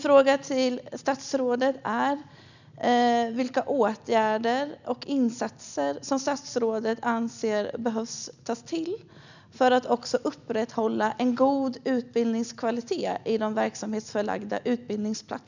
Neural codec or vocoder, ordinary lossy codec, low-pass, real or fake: none; none; 7.2 kHz; real